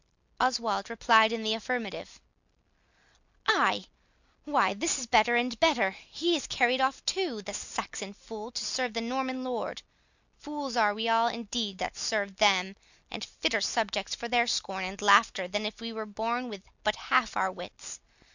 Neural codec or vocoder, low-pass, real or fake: none; 7.2 kHz; real